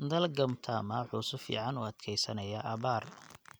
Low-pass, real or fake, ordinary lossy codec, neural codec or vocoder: none; real; none; none